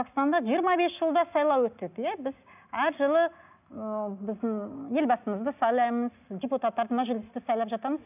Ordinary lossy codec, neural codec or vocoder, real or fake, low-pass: none; none; real; 3.6 kHz